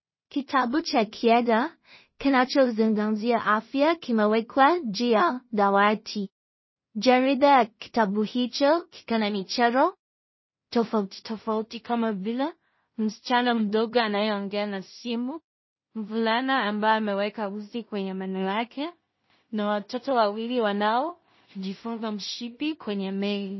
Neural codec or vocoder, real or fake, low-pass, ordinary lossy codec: codec, 16 kHz in and 24 kHz out, 0.4 kbps, LongCat-Audio-Codec, two codebook decoder; fake; 7.2 kHz; MP3, 24 kbps